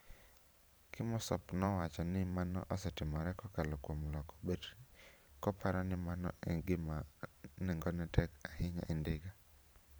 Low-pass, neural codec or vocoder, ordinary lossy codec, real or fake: none; vocoder, 44.1 kHz, 128 mel bands every 512 samples, BigVGAN v2; none; fake